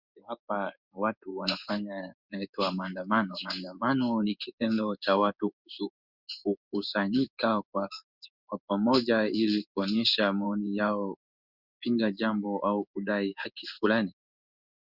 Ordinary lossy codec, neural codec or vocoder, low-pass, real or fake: Opus, 64 kbps; codec, 16 kHz in and 24 kHz out, 1 kbps, XY-Tokenizer; 5.4 kHz; fake